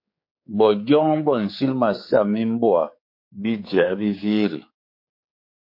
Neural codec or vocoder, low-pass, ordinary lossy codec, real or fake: codec, 16 kHz, 4 kbps, X-Codec, HuBERT features, trained on general audio; 5.4 kHz; MP3, 32 kbps; fake